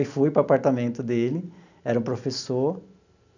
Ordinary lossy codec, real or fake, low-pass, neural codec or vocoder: none; real; 7.2 kHz; none